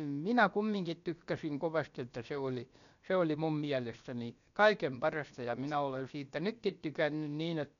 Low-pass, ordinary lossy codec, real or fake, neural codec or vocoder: 7.2 kHz; none; fake; codec, 16 kHz, about 1 kbps, DyCAST, with the encoder's durations